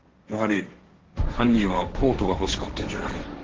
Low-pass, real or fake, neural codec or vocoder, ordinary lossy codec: 7.2 kHz; fake; codec, 16 kHz, 1.1 kbps, Voila-Tokenizer; Opus, 16 kbps